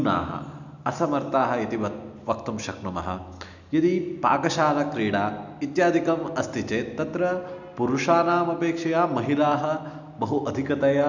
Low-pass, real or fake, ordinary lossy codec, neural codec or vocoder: 7.2 kHz; real; none; none